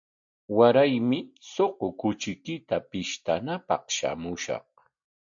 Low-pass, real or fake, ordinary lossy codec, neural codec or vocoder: 7.2 kHz; real; AAC, 64 kbps; none